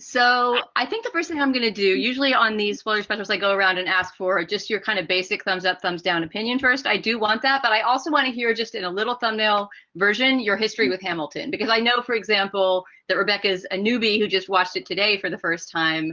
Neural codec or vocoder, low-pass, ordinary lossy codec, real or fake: none; 7.2 kHz; Opus, 32 kbps; real